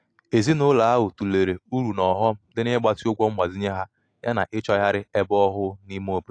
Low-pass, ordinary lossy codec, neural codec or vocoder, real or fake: 9.9 kHz; AAC, 48 kbps; none; real